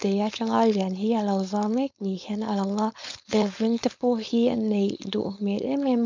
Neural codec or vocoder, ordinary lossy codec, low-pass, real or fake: codec, 16 kHz, 4.8 kbps, FACodec; MP3, 64 kbps; 7.2 kHz; fake